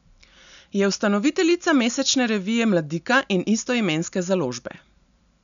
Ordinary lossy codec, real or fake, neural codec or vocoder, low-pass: none; real; none; 7.2 kHz